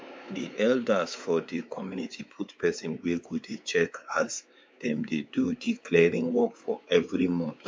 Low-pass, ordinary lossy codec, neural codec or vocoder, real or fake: none; none; codec, 16 kHz, 4 kbps, X-Codec, WavLM features, trained on Multilingual LibriSpeech; fake